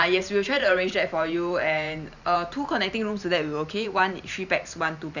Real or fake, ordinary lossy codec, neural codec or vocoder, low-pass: fake; none; vocoder, 44.1 kHz, 128 mel bands every 512 samples, BigVGAN v2; 7.2 kHz